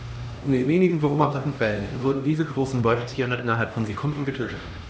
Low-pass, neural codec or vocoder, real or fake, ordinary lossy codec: none; codec, 16 kHz, 1 kbps, X-Codec, HuBERT features, trained on LibriSpeech; fake; none